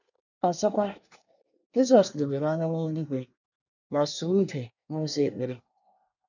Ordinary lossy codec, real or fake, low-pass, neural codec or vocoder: none; fake; 7.2 kHz; codec, 24 kHz, 1 kbps, SNAC